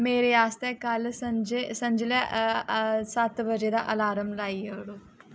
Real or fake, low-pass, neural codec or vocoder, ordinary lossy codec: real; none; none; none